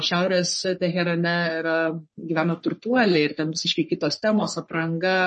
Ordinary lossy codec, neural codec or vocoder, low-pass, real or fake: MP3, 32 kbps; codec, 44.1 kHz, 3.4 kbps, Pupu-Codec; 10.8 kHz; fake